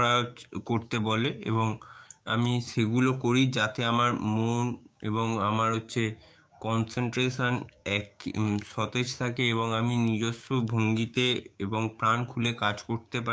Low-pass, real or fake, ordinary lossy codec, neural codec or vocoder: none; fake; none; codec, 16 kHz, 6 kbps, DAC